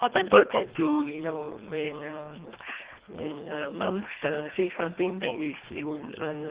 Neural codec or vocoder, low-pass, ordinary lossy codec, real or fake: codec, 24 kHz, 1.5 kbps, HILCodec; 3.6 kHz; Opus, 16 kbps; fake